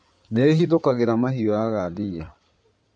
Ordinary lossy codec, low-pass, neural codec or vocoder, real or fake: none; 9.9 kHz; codec, 16 kHz in and 24 kHz out, 2.2 kbps, FireRedTTS-2 codec; fake